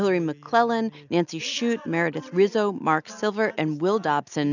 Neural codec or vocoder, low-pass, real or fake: none; 7.2 kHz; real